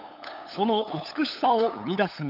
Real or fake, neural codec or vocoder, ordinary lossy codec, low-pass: fake; codec, 16 kHz, 8 kbps, FunCodec, trained on LibriTTS, 25 frames a second; none; 5.4 kHz